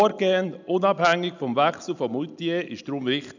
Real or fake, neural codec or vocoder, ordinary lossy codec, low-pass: real; none; none; 7.2 kHz